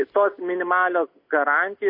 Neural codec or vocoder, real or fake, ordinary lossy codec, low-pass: none; real; MP3, 32 kbps; 5.4 kHz